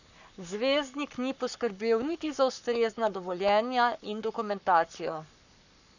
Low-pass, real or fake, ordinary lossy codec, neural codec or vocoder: 7.2 kHz; fake; none; codec, 44.1 kHz, 7.8 kbps, Pupu-Codec